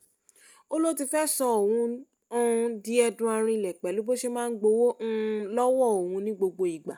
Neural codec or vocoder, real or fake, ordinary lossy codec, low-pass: none; real; none; none